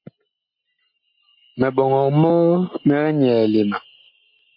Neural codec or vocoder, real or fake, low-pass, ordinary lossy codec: none; real; 5.4 kHz; MP3, 32 kbps